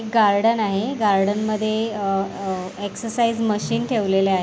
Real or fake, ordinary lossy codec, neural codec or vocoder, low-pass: real; none; none; none